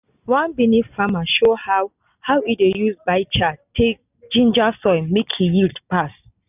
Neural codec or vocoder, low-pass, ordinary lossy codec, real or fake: none; 3.6 kHz; none; real